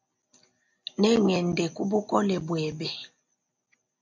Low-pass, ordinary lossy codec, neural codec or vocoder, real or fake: 7.2 kHz; MP3, 48 kbps; none; real